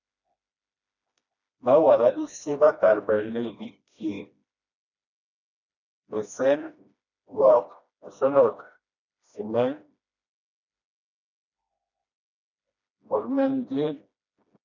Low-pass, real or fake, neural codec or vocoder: 7.2 kHz; fake; codec, 16 kHz, 1 kbps, FreqCodec, smaller model